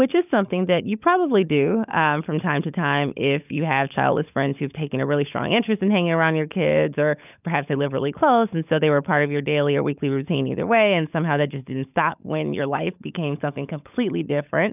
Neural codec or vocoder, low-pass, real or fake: codec, 16 kHz, 16 kbps, FunCodec, trained on LibriTTS, 50 frames a second; 3.6 kHz; fake